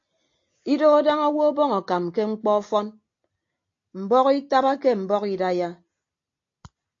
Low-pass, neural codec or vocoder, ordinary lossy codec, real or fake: 7.2 kHz; none; AAC, 48 kbps; real